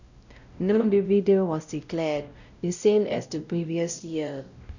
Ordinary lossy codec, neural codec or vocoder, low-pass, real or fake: none; codec, 16 kHz, 0.5 kbps, X-Codec, WavLM features, trained on Multilingual LibriSpeech; 7.2 kHz; fake